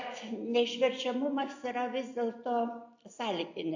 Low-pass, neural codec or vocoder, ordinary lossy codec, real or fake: 7.2 kHz; none; AAC, 48 kbps; real